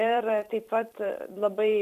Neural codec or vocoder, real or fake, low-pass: vocoder, 44.1 kHz, 128 mel bands every 256 samples, BigVGAN v2; fake; 14.4 kHz